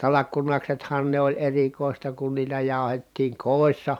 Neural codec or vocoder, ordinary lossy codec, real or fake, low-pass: vocoder, 44.1 kHz, 128 mel bands every 512 samples, BigVGAN v2; none; fake; 19.8 kHz